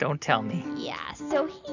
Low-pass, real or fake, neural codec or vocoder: 7.2 kHz; real; none